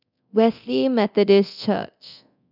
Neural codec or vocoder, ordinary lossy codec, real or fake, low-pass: codec, 24 kHz, 0.9 kbps, DualCodec; none; fake; 5.4 kHz